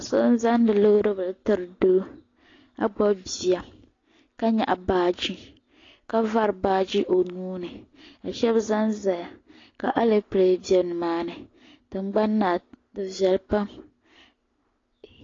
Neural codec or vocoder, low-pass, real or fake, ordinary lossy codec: none; 7.2 kHz; real; AAC, 32 kbps